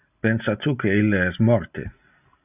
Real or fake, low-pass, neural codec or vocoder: real; 3.6 kHz; none